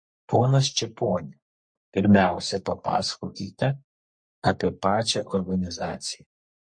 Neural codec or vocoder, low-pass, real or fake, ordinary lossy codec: codec, 44.1 kHz, 3.4 kbps, Pupu-Codec; 9.9 kHz; fake; MP3, 48 kbps